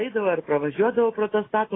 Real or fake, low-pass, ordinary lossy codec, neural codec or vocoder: real; 7.2 kHz; AAC, 16 kbps; none